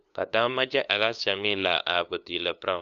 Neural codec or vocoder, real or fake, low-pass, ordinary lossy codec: codec, 16 kHz, 2 kbps, FunCodec, trained on LibriTTS, 25 frames a second; fake; 7.2 kHz; none